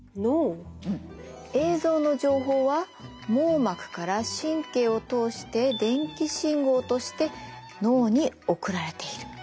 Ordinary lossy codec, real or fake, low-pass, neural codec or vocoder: none; real; none; none